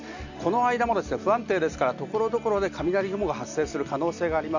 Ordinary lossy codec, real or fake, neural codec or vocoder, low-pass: none; real; none; 7.2 kHz